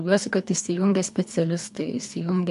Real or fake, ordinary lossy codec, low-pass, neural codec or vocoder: fake; MP3, 64 kbps; 10.8 kHz; codec, 24 kHz, 3 kbps, HILCodec